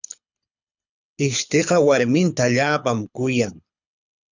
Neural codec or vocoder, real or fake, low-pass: codec, 24 kHz, 6 kbps, HILCodec; fake; 7.2 kHz